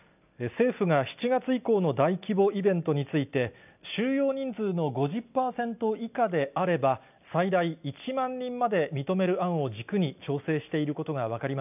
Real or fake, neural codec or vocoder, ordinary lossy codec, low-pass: real; none; none; 3.6 kHz